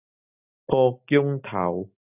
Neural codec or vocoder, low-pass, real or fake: none; 3.6 kHz; real